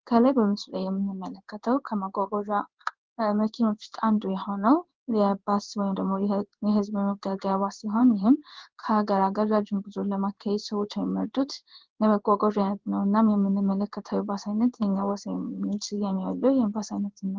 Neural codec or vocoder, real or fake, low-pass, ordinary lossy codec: codec, 16 kHz in and 24 kHz out, 1 kbps, XY-Tokenizer; fake; 7.2 kHz; Opus, 16 kbps